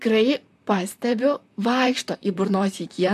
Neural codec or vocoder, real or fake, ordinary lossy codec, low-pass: vocoder, 44.1 kHz, 128 mel bands, Pupu-Vocoder; fake; AAC, 96 kbps; 14.4 kHz